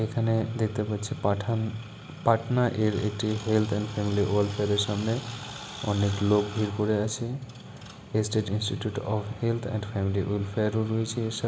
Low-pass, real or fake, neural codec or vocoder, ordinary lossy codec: none; real; none; none